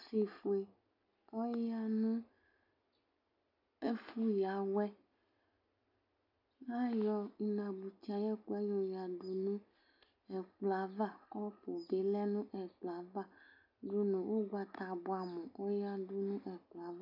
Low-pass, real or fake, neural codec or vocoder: 5.4 kHz; real; none